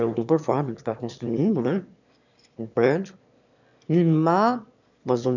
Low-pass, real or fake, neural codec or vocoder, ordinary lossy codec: 7.2 kHz; fake; autoencoder, 22.05 kHz, a latent of 192 numbers a frame, VITS, trained on one speaker; none